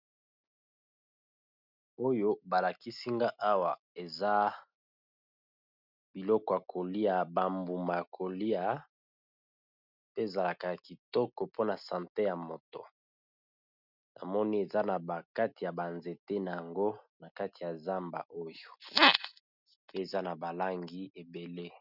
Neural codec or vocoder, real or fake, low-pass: none; real; 5.4 kHz